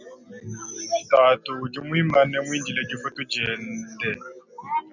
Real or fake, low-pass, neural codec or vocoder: real; 7.2 kHz; none